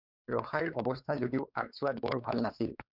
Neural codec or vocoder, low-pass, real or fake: codec, 16 kHz, 4 kbps, X-Codec, WavLM features, trained on Multilingual LibriSpeech; 5.4 kHz; fake